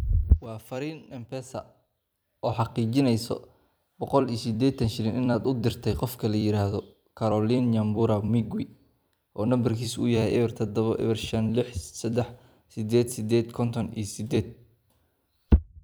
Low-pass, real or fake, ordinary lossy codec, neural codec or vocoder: none; fake; none; vocoder, 44.1 kHz, 128 mel bands every 256 samples, BigVGAN v2